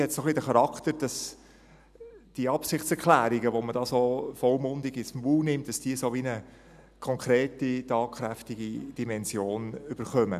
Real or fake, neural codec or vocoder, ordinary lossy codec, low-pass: real; none; none; 14.4 kHz